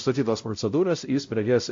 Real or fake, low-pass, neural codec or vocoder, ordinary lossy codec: fake; 7.2 kHz; codec, 16 kHz, 0.5 kbps, X-Codec, WavLM features, trained on Multilingual LibriSpeech; MP3, 48 kbps